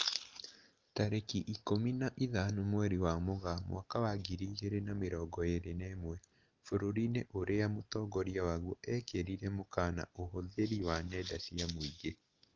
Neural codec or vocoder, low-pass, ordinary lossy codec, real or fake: none; 7.2 kHz; Opus, 24 kbps; real